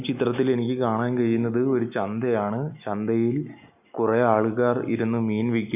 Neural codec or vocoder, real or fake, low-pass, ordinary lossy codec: none; real; 3.6 kHz; none